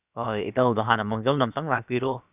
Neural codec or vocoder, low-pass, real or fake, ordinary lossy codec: codec, 16 kHz, about 1 kbps, DyCAST, with the encoder's durations; 3.6 kHz; fake; none